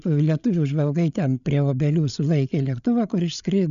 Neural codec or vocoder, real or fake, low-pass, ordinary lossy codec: codec, 16 kHz, 8 kbps, FreqCodec, larger model; fake; 7.2 kHz; MP3, 96 kbps